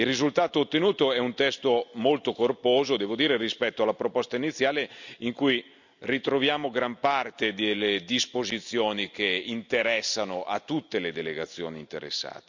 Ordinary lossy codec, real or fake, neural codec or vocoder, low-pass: none; real; none; 7.2 kHz